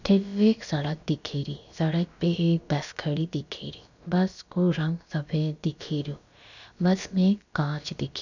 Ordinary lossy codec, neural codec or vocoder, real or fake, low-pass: none; codec, 16 kHz, about 1 kbps, DyCAST, with the encoder's durations; fake; 7.2 kHz